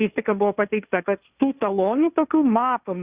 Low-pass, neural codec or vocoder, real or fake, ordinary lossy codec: 3.6 kHz; codec, 16 kHz, 1.1 kbps, Voila-Tokenizer; fake; Opus, 64 kbps